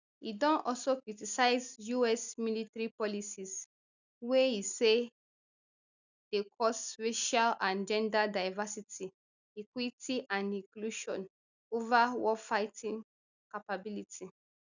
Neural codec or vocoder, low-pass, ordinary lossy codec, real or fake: none; 7.2 kHz; none; real